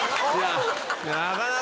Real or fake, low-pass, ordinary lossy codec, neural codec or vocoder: real; none; none; none